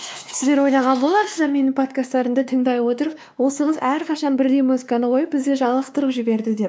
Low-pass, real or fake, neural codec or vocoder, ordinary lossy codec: none; fake; codec, 16 kHz, 2 kbps, X-Codec, WavLM features, trained on Multilingual LibriSpeech; none